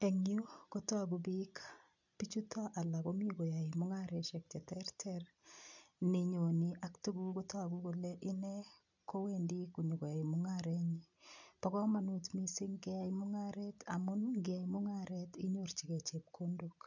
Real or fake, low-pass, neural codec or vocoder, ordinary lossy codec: real; 7.2 kHz; none; none